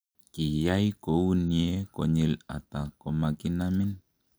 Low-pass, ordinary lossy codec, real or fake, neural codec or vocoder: none; none; real; none